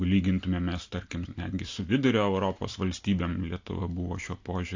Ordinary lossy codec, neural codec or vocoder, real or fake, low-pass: AAC, 48 kbps; none; real; 7.2 kHz